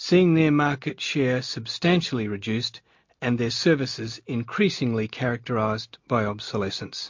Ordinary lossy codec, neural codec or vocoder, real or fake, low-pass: MP3, 48 kbps; none; real; 7.2 kHz